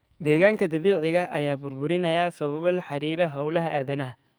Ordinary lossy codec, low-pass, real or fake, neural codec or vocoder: none; none; fake; codec, 44.1 kHz, 2.6 kbps, SNAC